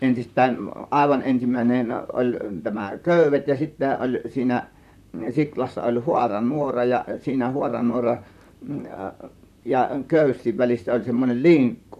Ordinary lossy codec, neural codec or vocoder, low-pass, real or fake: none; vocoder, 44.1 kHz, 128 mel bands, Pupu-Vocoder; 14.4 kHz; fake